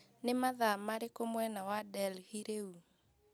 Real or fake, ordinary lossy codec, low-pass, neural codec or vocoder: real; none; none; none